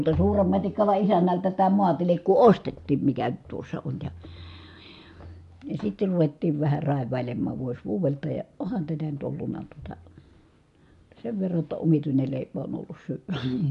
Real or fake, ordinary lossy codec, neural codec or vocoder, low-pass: real; MP3, 64 kbps; none; 10.8 kHz